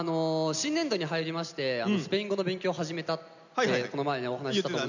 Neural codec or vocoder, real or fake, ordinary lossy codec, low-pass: none; real; none; 7.2 kHz